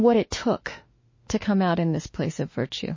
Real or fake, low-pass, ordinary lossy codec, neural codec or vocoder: fake; 7.2 kHz; MP3, 32 kbps; autoencoder, 48 kHz, 32 numbers a frame, DAC-VAE, trained on Japanese speech